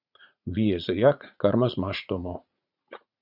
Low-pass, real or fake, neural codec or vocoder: 5.4 kHz; fake; vocoder, 44.1 kHz, 80 mel bands, Vocos